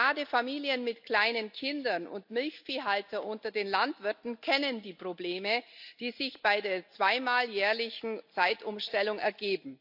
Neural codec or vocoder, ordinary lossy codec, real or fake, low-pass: none; AAC, 48 kbps; real; 5.4 kHz